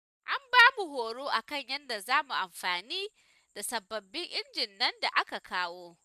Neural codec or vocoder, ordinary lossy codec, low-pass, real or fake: none; none; 14.4 kHz; real